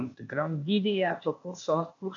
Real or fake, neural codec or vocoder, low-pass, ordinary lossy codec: fake; codec, 16 kHz, 0.8 kbps, ZipCodec; 7.2 kHz; MP3, 96 kbps